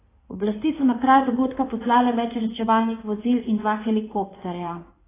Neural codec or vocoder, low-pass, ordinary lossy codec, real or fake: codec, 16 kHz in and 24 kHz out, 2.2 kbps, FireRedTTS-2 codec; 3.6 kHz; AAC, 16 kbps; fake